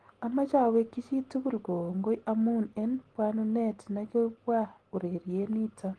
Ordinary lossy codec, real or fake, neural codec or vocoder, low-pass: Opus, 16 kbps; real; none; 10.8 kHz